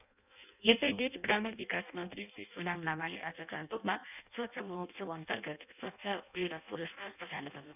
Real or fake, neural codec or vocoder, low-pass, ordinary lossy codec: fake; codec, 16 kHz in and 24 kHz out, 0.6 kbps, FireRedTTS-2 codec; 3.6 kHz; none